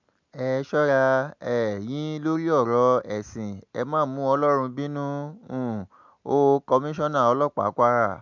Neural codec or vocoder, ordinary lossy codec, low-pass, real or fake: none; MP3, 64 kbps; 7.2 kHz; real